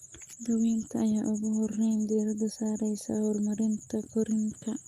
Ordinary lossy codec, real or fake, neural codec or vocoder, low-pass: Opus, 32 kbps; real; none; 14.4 kHz